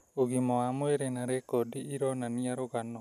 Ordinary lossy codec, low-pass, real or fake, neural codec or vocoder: none; 14.4 kHz; real; none